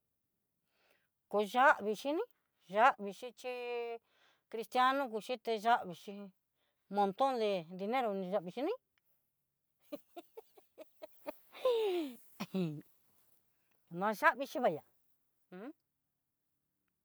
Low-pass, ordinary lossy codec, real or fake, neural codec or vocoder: none; none; real; none